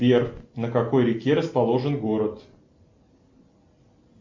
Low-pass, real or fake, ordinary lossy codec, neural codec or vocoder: 7.2 kHz; real; MP3, 48 kbps; none